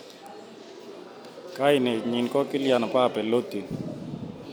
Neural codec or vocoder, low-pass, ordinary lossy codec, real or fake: none; none; none; real